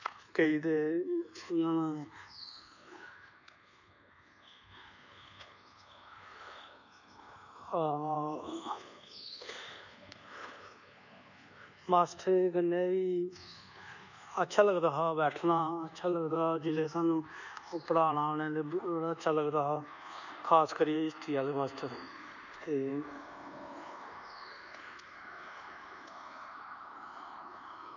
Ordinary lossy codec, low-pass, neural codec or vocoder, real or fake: none; 7.2 kHz; codec, 24 kHz, 1.2 kbps, DualCodec; fake